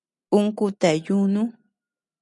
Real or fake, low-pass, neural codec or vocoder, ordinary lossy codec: real; 10.8 kHz; none; MP3, 96 kbps